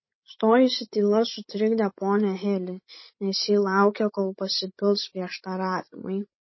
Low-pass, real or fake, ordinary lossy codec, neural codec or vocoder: 7.2 kHz; fake; MP3, 24 kbps; codec, 16 kHz, 16 kbps, FreqCodec, larger model